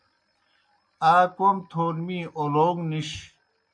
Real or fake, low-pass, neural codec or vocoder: real; 9.9 kHz; none